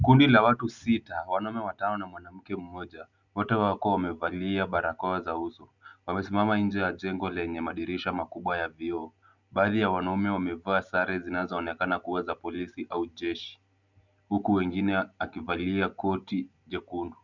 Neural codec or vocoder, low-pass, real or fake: none; 7.2 kHz; real